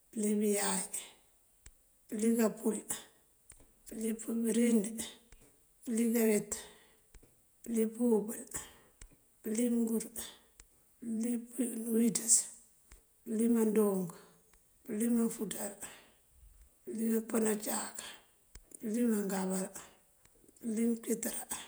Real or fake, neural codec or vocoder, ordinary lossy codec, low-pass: fake; vocoder, 48 kHz, 128 mel bands, Vocos; none; none